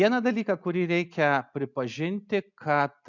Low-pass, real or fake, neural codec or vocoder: 7.2 kHz; real; none